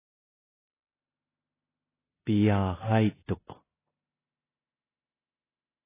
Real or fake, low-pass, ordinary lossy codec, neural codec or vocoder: fake; 3.6 kHz; AAC, 16 kbps; codec, 16 kHz in and 24 kHz out, 0.9 kbps, LongCat-Audio-Codec, four codebook decoder